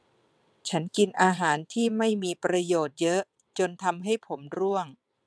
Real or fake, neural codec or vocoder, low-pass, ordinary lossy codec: fake; vocoder, 22.05 kHz, 80 mel bands, WaveNeXt; none; none